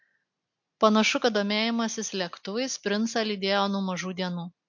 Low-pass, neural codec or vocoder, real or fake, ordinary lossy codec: 7.2 kHz; none; real; MP3, 48 kbps